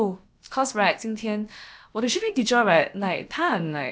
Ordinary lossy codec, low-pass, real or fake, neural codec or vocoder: none; none; fake; codec, 16 kHz, about 1 kbps, DyCAST, with the encoder's durations